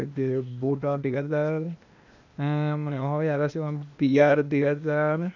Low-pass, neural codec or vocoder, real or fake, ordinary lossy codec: 7.2 kHz; codec, 16 kHz, 0.8 kbps, ZipCodec; fake; none